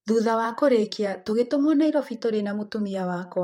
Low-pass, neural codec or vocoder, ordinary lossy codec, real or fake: 19.8 kHz; vocoder, 44.1 kHz, 128 mel bands, Pupu-Vocoder; MP3, 64 kbps; fake